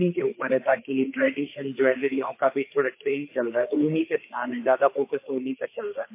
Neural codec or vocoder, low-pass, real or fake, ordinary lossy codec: codec, 16 kHz, 4 kbps, FreqCodec, larger model; 3.6 kHz; fake; MP3, 24 kbps